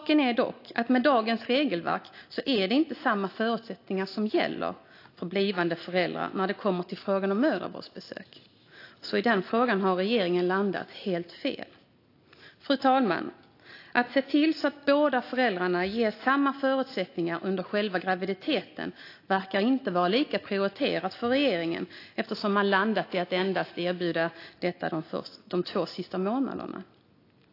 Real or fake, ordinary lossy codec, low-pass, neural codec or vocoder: real; AAC, 32 kbps; 5.4 kHz; none